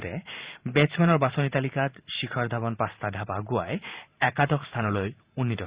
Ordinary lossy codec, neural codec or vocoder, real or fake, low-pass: Opus, 64 kbps; vocoder, 44.1 kHz, 128 mel bands every 512 samples, BigVGAN v2; fake; 3.6 kHz